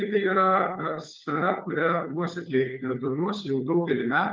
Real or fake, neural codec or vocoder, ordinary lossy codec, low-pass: fake; codec, 16 kHz, 4 kbps, FunCodec, trained on LibriTTS, 50 frames a second; Opus, 24 kbps; 7.2 kHz